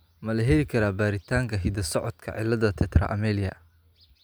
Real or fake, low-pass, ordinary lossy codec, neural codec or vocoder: real; none; none; none